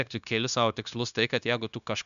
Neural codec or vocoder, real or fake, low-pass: codec, 16 kHz, 0.9 kbps, LongCat-Audio-Codec; fake; 7.2 kHz